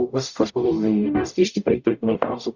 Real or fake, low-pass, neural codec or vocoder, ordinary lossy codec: fake; 7.2 kHz; codec, 44.1 kHz, 0.9 kbps, DAC; Opus, 64 kbps